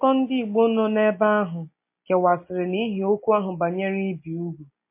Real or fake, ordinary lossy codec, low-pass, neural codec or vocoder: real; MP3, 24 kbps; 3.6 kHz; none